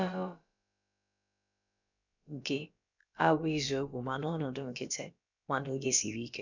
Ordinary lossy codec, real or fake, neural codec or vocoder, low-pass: none; fake; codec, 16 kHz, about 1 kbps, DyCAST, with the encoder's durations; 7.2 kHz